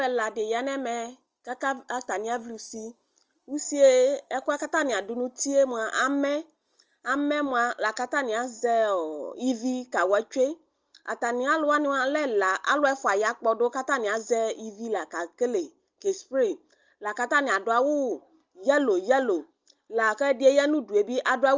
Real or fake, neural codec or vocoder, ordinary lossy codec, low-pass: real; none; Opus, 24 kbps; 7.2 kHz